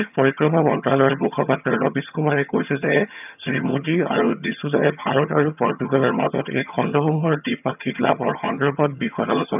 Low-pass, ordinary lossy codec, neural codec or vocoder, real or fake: 3.6 kHz; none; vocoder, 22.05 kHz, 80 mel bands, HiFi-GAN; fake